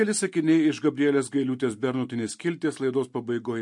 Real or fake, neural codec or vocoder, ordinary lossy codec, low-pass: real; none; MP3, 48 kbps; 10.8 kHz